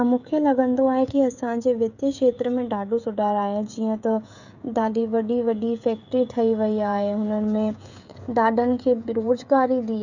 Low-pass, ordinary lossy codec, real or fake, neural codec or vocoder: 7.2 kHz; none; fake; codec, 16 kHz, 16 kbps, FreqCodec, smaller model